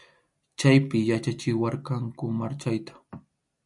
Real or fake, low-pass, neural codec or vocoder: real; 10.8 kHz; none